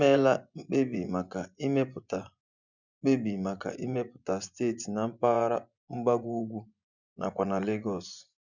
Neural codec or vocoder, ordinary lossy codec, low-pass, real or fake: vocoder, 24 kHz, 100 mel bands, Vocos; none; 7.2 kHz; fake